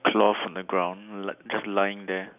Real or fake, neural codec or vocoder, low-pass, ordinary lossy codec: real; none; 3.6 kHz; none